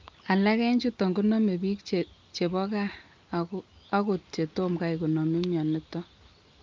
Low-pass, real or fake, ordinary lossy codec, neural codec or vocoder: 7.2 kHz; real; Opus, 32 kbps; none